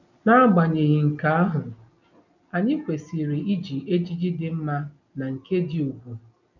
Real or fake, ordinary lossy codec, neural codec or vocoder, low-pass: real; none; none; 7.2 kHz